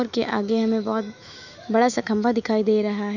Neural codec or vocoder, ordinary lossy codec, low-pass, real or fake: autoencoder, 48 kHz, 128 numbers a frame, DAC-VAE, trained on Japanese speech; none; 7.2 kHz; fake